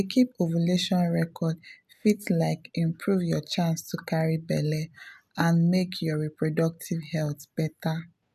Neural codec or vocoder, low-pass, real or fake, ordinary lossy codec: none; 14.4 kHz; real; none